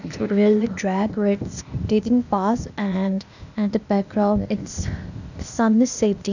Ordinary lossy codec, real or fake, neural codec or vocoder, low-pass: none; fake; codec, 16 kHz, 0.8 kbps, ZipCodec; 7.2 kHz